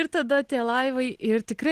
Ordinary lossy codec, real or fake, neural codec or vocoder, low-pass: Opus, 16 kbps; real; none; 14.4 kHz